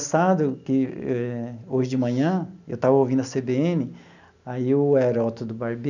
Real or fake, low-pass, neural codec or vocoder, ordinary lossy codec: real; 7.2 kHz; none; none